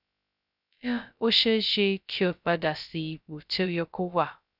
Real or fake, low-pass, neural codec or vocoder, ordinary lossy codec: fake; 5.4 kHz; codec, 16 kHz, 0.2 kbps, FocalCodec; none